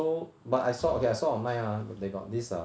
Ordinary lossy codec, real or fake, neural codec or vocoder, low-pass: none; real; none; none